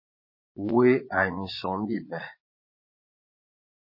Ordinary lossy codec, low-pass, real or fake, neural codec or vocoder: MP3, 24 kbps; 5.4 kHz; fake; vocoder, 22.05 kHz, 80 mel bands, Vocos